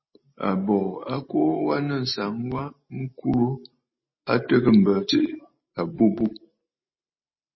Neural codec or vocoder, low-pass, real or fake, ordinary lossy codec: none; 7.2 kHz; real; MP3, 24 kbps